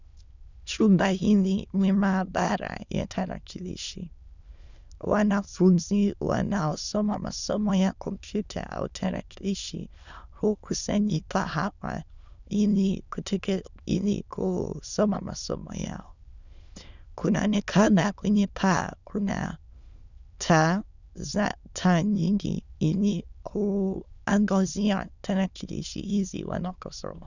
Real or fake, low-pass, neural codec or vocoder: fake; 7.2 kHz; autoencoder, 22.05 kHz, a latent of 192 numbers a frame, VITS, trained on many speakers